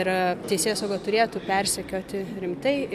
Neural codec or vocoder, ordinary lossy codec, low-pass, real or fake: none; AAC, 96 kbps; 14.4 kHz; real